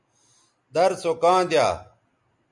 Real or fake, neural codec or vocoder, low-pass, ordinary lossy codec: real; none; 10.8 kHz; MP3, 64 kbps